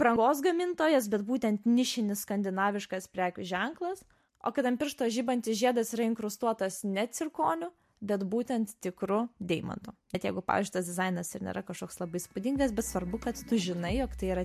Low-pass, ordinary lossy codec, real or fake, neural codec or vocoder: 14.4 kHz; MP3, 64 kbps; real; none